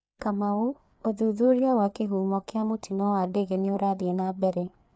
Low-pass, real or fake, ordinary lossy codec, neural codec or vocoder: none; fake; none; codec, 16 kHz, 4 kbps, FreqCodec, larger model